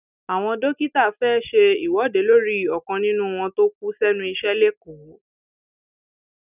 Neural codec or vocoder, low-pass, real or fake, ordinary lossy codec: none; 3.6 kHz; real; none